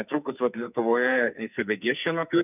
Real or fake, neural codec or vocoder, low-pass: fake; codec, 44.1 kHz, 2.6 kbps, SNAC; 3.6 kHz